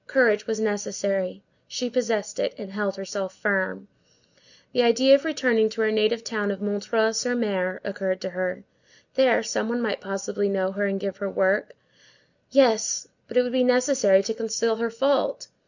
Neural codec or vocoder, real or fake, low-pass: none; real; 7.2 kHz